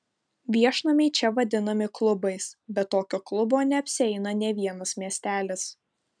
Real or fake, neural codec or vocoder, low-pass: real; none; 9.9 kHz